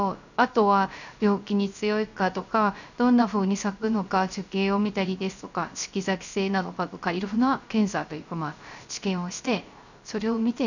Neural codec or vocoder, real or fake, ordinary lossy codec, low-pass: codec, 16 kHz, 0.3 kbps, FocalCodec; fake; none; 7.2 kHz